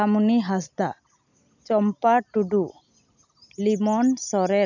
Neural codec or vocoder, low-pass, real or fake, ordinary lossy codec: none; 7.2 kHz; real; none